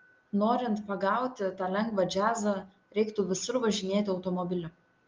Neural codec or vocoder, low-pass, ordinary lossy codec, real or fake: none; 7.2 kHz; Opus, 32 kbps; real